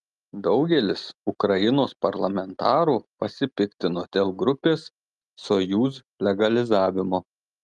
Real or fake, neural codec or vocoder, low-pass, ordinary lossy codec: real; none; 10.8 kHz; Opus, 32 kbps